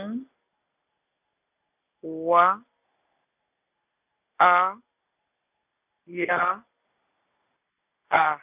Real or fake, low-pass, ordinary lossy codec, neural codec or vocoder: real; 3.6 kHz; none; none